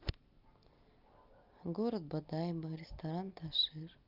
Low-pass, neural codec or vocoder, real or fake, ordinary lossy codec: 5.4 kHz; none; real; Opus, 24 kbps